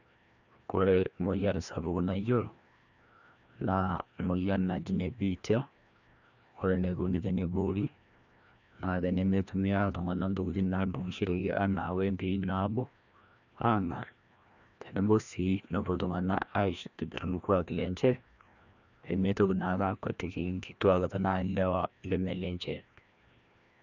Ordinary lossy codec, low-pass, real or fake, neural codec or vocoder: none; 7.2 kHz; fake; codec, 16 kHz, 1 kbps, FreqCodec, larger model